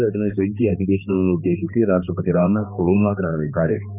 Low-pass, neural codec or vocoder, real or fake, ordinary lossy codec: 3.6 kHz; codec, 16 kHz, 2 kbps, X-Codec, HuBERT features, trained on balanced general audio; fake; none